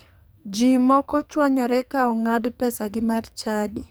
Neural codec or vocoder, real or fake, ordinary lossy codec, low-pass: codec, 44.1 kHz, 2.6 kbps, SNAC; fake; none; none